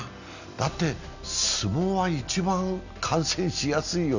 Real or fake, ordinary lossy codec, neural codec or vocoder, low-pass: real; none; none; 7.2 kHz